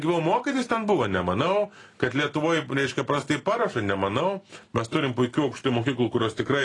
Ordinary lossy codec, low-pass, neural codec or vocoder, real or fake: AAC, 32 kbps; 10.8 kHz; none; real